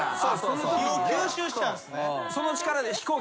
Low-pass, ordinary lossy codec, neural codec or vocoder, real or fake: none; none; none; real